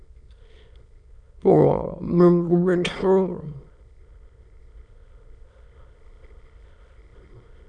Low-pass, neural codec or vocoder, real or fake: 9.9 kHz; autoencoder, 22.05 kHz, a latent of 192 numbers a frame, VITS, trained on many speakers; fake